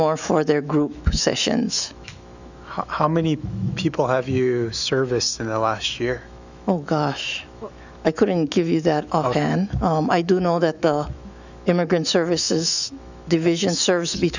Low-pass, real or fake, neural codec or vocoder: 7.2 kHz; fake; autoencoder, 48 kHz, 128 numbers a frame, DAC-VAE, trained on Japanese speech